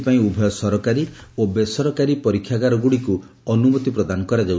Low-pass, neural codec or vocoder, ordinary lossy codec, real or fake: none; none; none; real